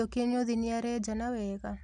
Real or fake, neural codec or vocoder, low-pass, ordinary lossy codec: real; none; 10.8 kHz; none